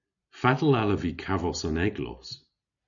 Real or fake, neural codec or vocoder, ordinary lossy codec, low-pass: real; none; MP3, 96 kbps; 7.2 kHz